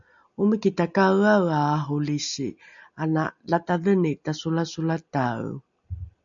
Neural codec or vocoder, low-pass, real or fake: none; 7.2 kHz; real